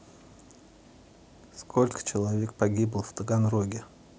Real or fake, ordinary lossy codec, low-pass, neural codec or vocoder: real; none; none; none